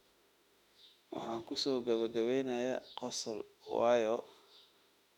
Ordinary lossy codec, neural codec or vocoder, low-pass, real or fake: none; autoencoder, 48 kHz, 32 numbers a frame, DAC-VAE, trained on Japanese speech; 19.8 kHz; fake